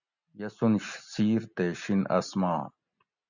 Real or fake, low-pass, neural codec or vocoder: real; 7.2 kHz; none